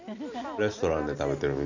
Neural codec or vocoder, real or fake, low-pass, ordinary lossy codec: none; real; 7.2 kHz; none